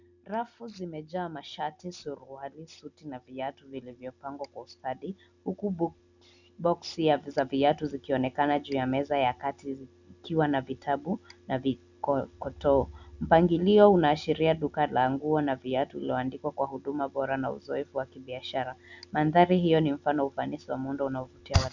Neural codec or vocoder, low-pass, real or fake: none; 7.2 kHz; real